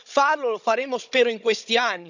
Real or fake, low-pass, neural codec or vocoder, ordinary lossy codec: fake; 7.2 kHz; codec, 16 kHz, 16 kbps, FunCodec, trained on Chinese and English, 50 frames a second; none